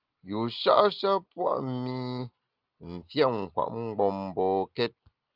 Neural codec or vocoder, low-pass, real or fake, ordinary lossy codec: none; 5.4 kHz; real; Opus, 24 kbps